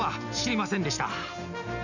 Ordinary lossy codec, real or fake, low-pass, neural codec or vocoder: none; real; 7.2 kHz; none